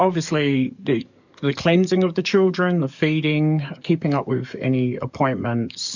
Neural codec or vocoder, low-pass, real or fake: codec, 44.1 kHz, 7.8 kbps, DAC; 7.2 kHz; fake